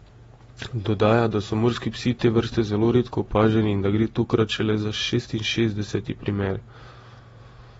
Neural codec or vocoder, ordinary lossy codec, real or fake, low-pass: vocoder, 48 kHz, 128 mel bands, Vocos; AAC, 24 kbps; fake; 19.8 kHz